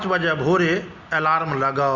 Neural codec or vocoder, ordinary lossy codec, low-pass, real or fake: none; Opus, 64 kbps; 7.2 kHz; real